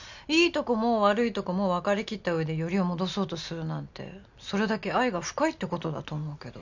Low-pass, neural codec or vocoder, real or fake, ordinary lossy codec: 7.2 kHz; none; real; none